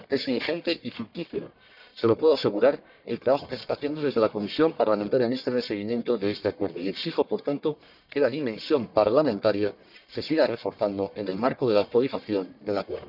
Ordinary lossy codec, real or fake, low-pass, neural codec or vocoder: none; fake; 5.4 kHz; codec, 44.1 kHz, 1.7 kbps, Pupu-Codec